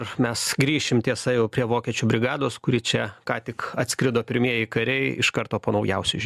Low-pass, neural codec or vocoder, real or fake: 14.4 kHz; none; real